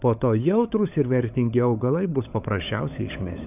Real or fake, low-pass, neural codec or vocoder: fake; 3.6 kHz; codec, 16 kHz, 16 kbps, FunCodec, trained on LibriTTS, 50 frames a second